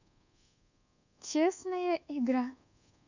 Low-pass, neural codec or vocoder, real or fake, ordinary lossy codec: 7.2 kHz; codec, 24 kHz, 1.2 kbps, DualCodec; fake; none